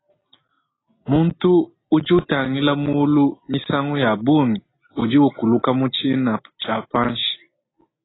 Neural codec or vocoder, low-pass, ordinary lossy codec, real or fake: none; 7.2 kHz; AAC, 16 kbps; real